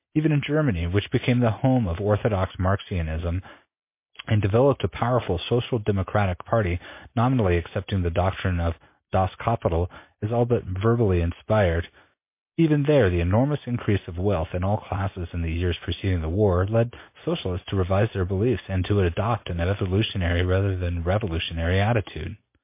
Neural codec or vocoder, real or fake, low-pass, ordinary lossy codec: none; real; 3.6 kHz; MP3, 24 kbps